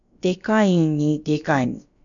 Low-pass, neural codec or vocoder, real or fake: 7.2 kHz; codec, 16 kHz, about 1 kbps, DyCAST, with the encoder's durations; fake